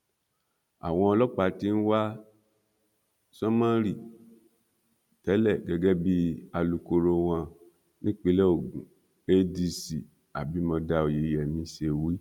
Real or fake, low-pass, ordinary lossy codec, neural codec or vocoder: real; 19.8 kHz; none; none